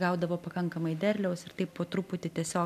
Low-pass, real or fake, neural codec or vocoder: 14.4 kHz; real; none